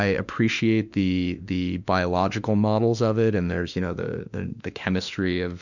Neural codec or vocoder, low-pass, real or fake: autoencoder, 48 kHz, 32 numbers a frame, DAC-VAE, trained on Japanese speech; 7.2 kHz; fake